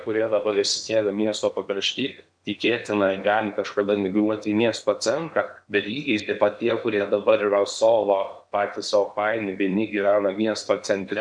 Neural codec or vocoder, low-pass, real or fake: codec, 16 kHz in and 24 kHz out, 0.8 kbps, FocalCodec, streaming, 65536 codes; 9.9 kHz; fake